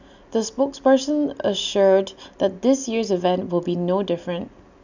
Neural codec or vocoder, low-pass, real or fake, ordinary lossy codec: none; 7.2 kHz; real; none